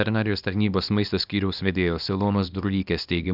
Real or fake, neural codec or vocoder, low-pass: fake; codec, 24 kHz, 0.9 kbps, WavTokenizer, small release; 5.4 kHz